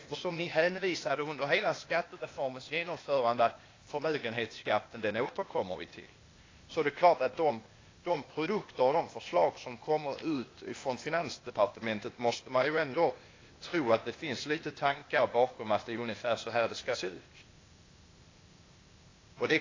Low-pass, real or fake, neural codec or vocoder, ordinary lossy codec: 7.2 kHz; fake; codec, 16 kHz, 0.8 kbps, ZipCodec; AAC, 32 kbps